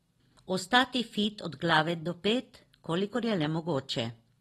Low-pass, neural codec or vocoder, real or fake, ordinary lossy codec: 19.8 kHz; none; real; AAC, 32 kbps